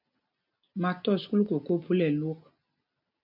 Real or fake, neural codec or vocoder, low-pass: real; none; 5.4 kHz